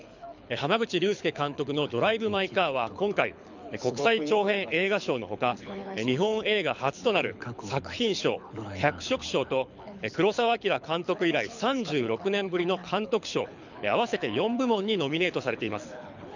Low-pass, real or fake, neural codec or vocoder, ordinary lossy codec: 7.2 kHz; fake; codec, 24 kHz, 6 kbps, HILCodec; none